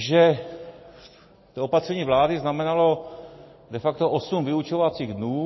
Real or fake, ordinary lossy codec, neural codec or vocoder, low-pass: real; MP3, 24 kbps; none; 7.2 kHz